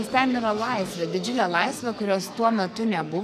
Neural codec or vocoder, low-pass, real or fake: codec, 44.1 kHz, 2.6 kbps, SNAC; 14.4 kHz; fake